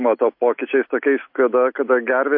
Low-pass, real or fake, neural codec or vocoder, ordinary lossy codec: 5.4 kHz; real; none; MP3, 32 kbps